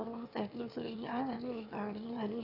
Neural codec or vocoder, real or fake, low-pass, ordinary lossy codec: autoencoder, 22.05 kHz, a latent of 192 numbers a frame, VITS, trained on one speaker; fake; 5.4 kHz; none